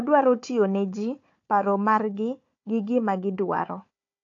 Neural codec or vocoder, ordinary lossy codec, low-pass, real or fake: codec, 16 kHz, 4 kbps, FunCodec, trained on Chinese and English, 50 frames a second; AAC, 48 kbps; 7.2 kHz; fake